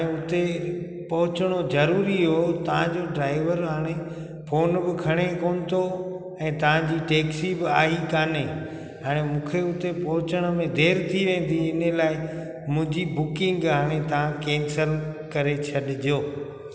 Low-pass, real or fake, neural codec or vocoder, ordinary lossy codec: none; real; none; none